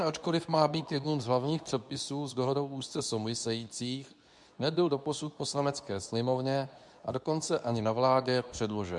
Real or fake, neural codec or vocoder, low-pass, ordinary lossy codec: fake; codec, 24 kHz, 0.9 kbps, WavTokenizer, medium speech release version 2; 10.8 kHz; MP3, 96 kbps